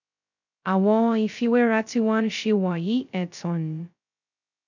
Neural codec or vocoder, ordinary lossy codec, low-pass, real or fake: codec, 16 kHz, 0.2 kbps, FocalCodec; none; 7.2 kHz; fake